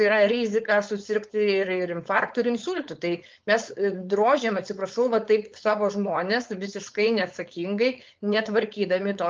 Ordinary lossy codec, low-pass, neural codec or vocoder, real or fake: Opus, 24 kbps; 7.2 kHz; codec, 16 kHz, 4.8 kbps, FACodec; fake